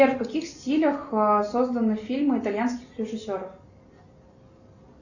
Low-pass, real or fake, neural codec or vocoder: 7.2 kHz; real; none